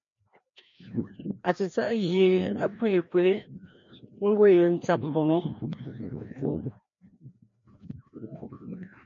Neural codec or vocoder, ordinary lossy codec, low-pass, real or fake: codec, 16 kHz, 1 kbps, FreqCodec, larger model; MP3, 48 kbps; 7.2 kHz; fake